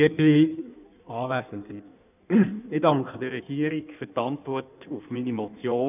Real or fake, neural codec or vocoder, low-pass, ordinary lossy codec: fake; codec, 16 kHz in and 24 kHz out, 1.1 kbps, FireRedTTS-2 codec; 3.6 kHz; none